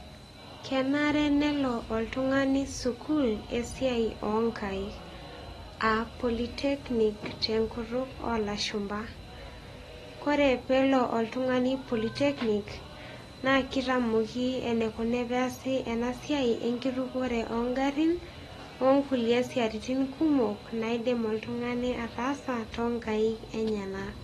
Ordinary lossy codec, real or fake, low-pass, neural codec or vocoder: AAC, 32 kbps; real; 19.8 kHz; none